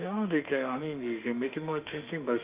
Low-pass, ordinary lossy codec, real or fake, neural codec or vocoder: 3.6 kHz; Opus, 32 kbps; fake; autoencoder, 48 kHz, 32 numbers a frame, DAC-VAE, trained on Japanese speech